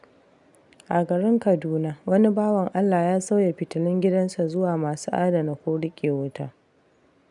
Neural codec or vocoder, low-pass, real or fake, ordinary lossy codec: none; 10.8 kHz; real; none